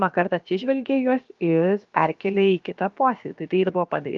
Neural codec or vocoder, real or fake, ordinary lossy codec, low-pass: codec, 16 kHz, about 1 kbps, DyCAST, with the encoder's durations; fake; Opus, 32 kbps; 7.2 kHz